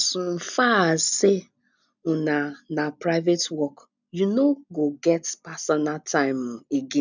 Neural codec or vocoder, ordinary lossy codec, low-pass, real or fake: none; none; 7.2 kHz; real